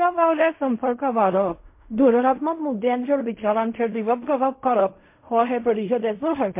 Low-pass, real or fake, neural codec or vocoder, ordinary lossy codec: 3.6 kHz; fake; codec, 16 kHz in and 24 kHz out, 0.4 kbps, LongCat-Audio-Codec, fine tuned four codebook decoder; MP3, 24 kbps